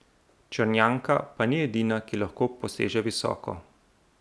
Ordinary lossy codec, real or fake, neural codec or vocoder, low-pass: none; real; none; none